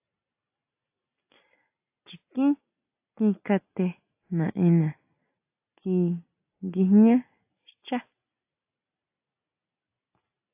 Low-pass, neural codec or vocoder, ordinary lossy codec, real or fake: 3.6 kHz; none; AAC, 32 kbps; real